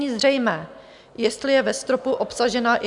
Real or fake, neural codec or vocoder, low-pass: real; none; 10.8 kHz